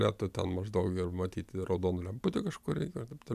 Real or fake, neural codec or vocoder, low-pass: real; none; 14.4 kHz